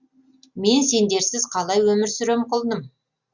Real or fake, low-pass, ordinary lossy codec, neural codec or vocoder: real; 7.2 kHz; Opus, 64 kbps; none